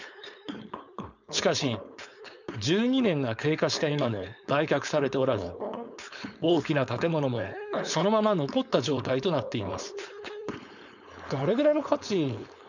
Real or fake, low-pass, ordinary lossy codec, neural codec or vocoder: fake; 7.2 kHz; none; codec, 16 kHz, 4.8 kbps, FACodec